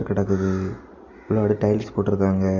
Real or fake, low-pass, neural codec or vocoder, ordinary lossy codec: real; 7.2 kHz; none; MP3, 64 kbps